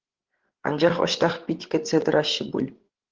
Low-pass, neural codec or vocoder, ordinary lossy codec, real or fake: 7.2 kHz; codec, 16 kHz, 8 kbps, FreqCodec, larger model; Opus, 16 kbps; fake